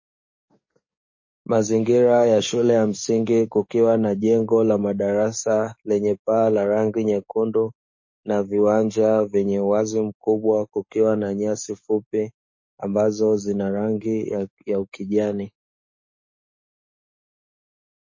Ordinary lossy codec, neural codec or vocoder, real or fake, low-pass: MP3, 32 kbps; codec, 44.1 kHz, 7.8 kbps, DAC; fake; 7.2 kHz